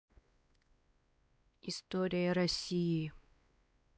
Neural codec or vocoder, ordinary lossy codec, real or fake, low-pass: codec, 16 kHz, 2 kbps, X-Codec, WavLM features, trained on Multilingual LibriSpeech; none; fake; none